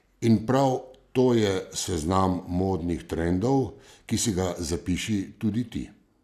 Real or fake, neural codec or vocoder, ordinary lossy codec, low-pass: real; none; none; 14.4 kHz